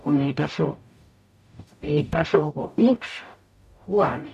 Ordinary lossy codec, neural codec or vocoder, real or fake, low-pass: none; codec, 44.1 kHz, 0.9 kbps, DAC; fake; 14.4 kHz